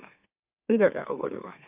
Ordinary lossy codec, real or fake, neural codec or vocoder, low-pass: AAC, 32 kbps; fake; autoencoder, 44.1 kHz, a latent of 192 numbers a frame, MeloTTS; 3.6 kHz